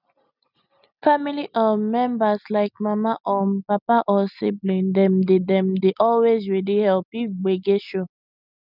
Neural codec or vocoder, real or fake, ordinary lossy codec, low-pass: none; real; none; 5.4 kHz